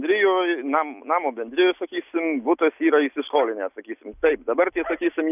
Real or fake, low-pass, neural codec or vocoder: real; 3.6 kHz; none